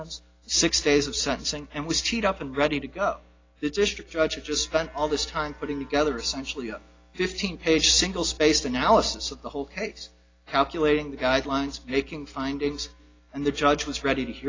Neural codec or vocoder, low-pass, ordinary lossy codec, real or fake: none; 7.2 kHz; AAC, 32 kbps; real